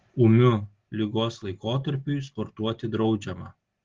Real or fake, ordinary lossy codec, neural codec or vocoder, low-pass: real; Opus, 16 kbps; none; 7.2 kHz